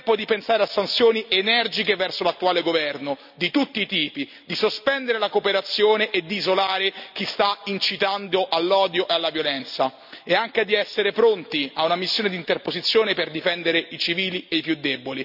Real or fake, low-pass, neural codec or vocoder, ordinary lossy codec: real; 5.4 kHz; none; none